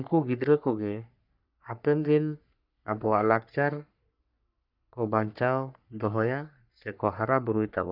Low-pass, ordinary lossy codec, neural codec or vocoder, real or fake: 5.4 kHz; none; codec, 44.1 kHz, 3.4 kbps, Pupu-Codec; fake